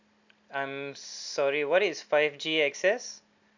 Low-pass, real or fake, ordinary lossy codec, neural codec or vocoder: 7.2 kHz; real; none; none